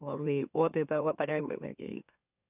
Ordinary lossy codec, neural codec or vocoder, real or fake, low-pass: none; autoencoder, 44.1 kHz, a latent of 192 numbers a frame, MeloTTS; fake; 3.6 kHz